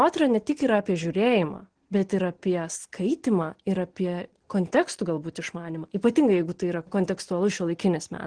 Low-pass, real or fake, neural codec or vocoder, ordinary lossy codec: 9.9 kHz; real; none; Opus, 16 kbps